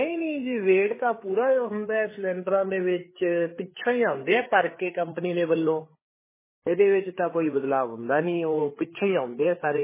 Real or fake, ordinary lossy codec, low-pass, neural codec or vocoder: fake; MP3, 16 kbps; 3.6 kHz; codec, 16 kHz, 4 kbps, FreqCodec, larger model